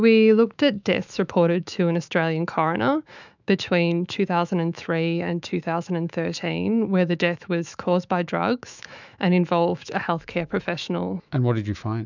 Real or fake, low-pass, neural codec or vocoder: fake; 7.2 kHz; autoencoder, 48 kHz, 128 numbers a frame, DAC-VAE, trained on Japanese speech